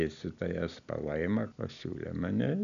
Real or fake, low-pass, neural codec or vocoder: real; 7.2 kHz; none